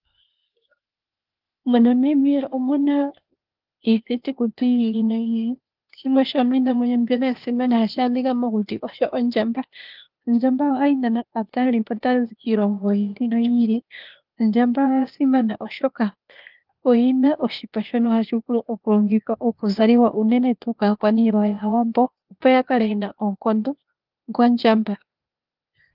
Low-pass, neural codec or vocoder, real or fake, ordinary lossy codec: 5.4 kHz; codec, 16 kHz, 0.8 kbps, ZipCodec; fake; Opus, 32 kbps